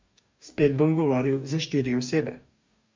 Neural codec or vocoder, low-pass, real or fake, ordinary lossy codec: codec, 44.1 kHz, 2.6 kbps, DAC; 7.2 kHz; fake; MP3, 64 kbps